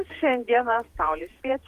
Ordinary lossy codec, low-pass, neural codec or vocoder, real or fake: Opus, 24 kbps; 14.4 kHz; vocoder, 48 kHz, 128 mel bands, Vocos; fake